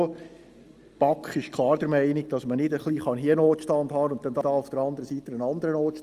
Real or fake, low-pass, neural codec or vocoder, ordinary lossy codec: real; 14.4 kHz; none; Opus, 32 kbps